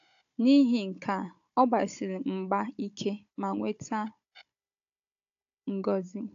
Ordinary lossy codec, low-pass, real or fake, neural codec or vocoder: AAC, 48 kbps; 7.2 kHz; fake; codec, 16 kHz, 16 kbps, FunCodec, trained on Chinese and English, 50 frames a second